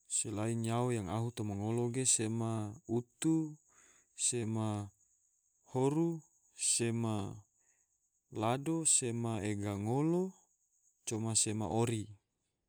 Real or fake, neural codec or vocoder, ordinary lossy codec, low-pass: real; none; none; none